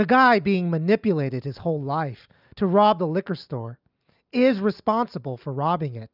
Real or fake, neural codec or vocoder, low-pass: real; none; 5.4 kHz